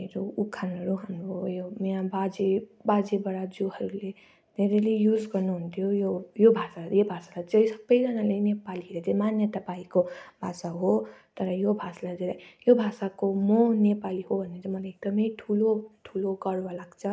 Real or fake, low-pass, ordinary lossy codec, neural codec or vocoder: real; none; none; none